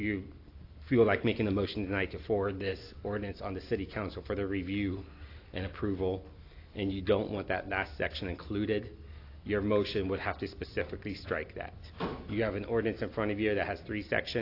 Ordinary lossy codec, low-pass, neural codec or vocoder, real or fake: AAC, 32 kbps; 5.4 kHz; none; real